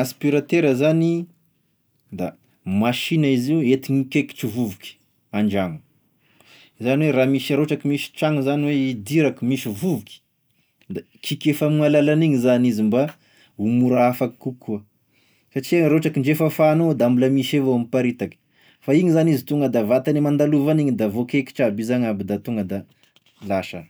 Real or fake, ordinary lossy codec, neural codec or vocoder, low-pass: real; none; none; none